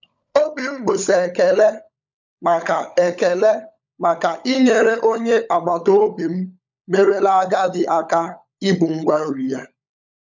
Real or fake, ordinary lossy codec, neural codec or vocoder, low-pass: fake; none; codec, 16 kHz, 16 kbps, FunCodec, trained on LibriTTS, 50 frames a second; 7.2 kHz